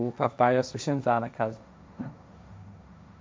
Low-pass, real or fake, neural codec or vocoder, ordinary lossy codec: none; fake; codec, 16 kHz, 1.1 kbps, Voila-Tokenizer; none